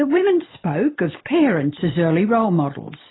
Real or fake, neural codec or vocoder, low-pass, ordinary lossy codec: real; none; 7.2 kHz; AAC, 16 kbps